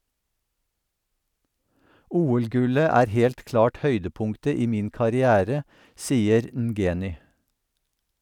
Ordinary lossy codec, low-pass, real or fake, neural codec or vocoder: none; 19.8 kHz; real; none